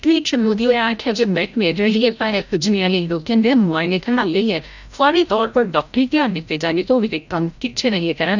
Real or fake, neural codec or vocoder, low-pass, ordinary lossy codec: fake; codec, 16 kHz, 0.5 kbps, FreqCodec, larger model; 7.2 kHz; none